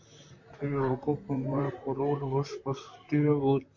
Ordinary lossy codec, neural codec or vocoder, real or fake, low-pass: MP3, 48 kbps; vocoder, 44.1 kHz, 128 mel bands, Pupu-Vocoder; fake; 7.2 kHz